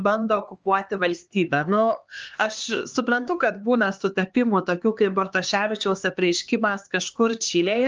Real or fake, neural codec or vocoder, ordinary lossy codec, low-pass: fake; codec, 16 kHz, 2 kbps, X-Codec, HuBERT features, trained on LibriSpeech; Opus, 24 kbps; 7.2 kHz